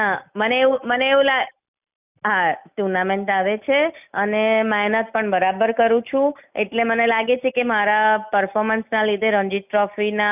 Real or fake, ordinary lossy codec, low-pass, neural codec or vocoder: real; none; 3.6 kHz; none